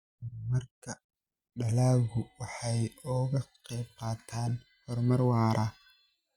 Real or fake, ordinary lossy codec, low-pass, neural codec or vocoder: real; Opus, 64 kbps; 19.8 kHz; none